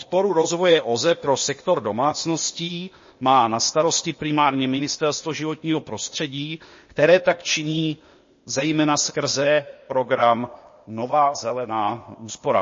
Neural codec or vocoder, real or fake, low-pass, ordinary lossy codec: codec, 16 kHz, 0.8 kbps, ZipCodec; fake; 7.2 kHz; MP3, 32 kbps